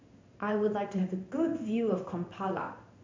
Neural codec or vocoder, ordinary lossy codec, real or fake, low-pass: codec, 16 kHz in and 24 kHz out, 1 kbps, XY-Tokenizer; MP3, 48 kbps; fake; 7.2 kHz